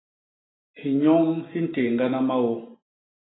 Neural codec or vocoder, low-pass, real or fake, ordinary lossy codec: none; 7.2 kHz; real; AAC, 16 kbps